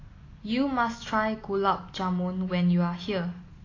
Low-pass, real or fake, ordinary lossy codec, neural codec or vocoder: 7.2 kHz; real; AAC, 32 kbps; none